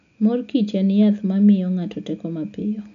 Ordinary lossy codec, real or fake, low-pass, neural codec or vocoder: none; real; 7.2 kHz; none